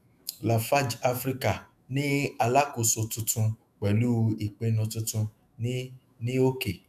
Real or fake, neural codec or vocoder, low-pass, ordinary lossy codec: fake; autoencoder, 48 kHz, 128 numbers a frame, DAC-VAE, trained on Japanese speech; 14.4 kHz; none